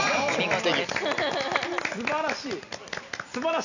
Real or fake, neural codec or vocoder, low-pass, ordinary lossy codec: real; none; 7.2 kHz; none